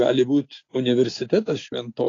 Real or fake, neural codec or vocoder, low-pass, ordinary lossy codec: real; none; 7.2 kHz; AAC, 32 kbps